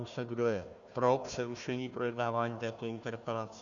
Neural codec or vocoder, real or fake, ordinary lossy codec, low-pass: codec, 16 kHz, 1 kbps, FunCodec, trained on Chinese and English, 50 frames a second; fake; Opus, 64 kbps; 7.2 kHz